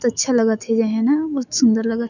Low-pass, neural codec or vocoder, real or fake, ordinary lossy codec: 7.2 kHz; autoencoder, 48 kHz, 128 numbers a frame, DAC-VAE, trained on Japanese speech; fake; none